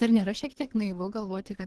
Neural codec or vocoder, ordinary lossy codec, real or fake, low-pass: codec, 24 kHz, 3 kbps, HILCodec; Opus, 16 kbps; fake; 10.8 kHz